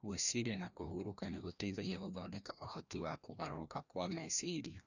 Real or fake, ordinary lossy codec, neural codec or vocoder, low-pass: fake; none; codec, 16 kHz, 1 kbps, FreqCodec, larger model; 7.2 kHz